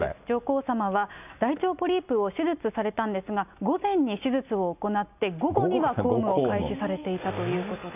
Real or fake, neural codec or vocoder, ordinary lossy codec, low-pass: real; none; none; 3.6 kHz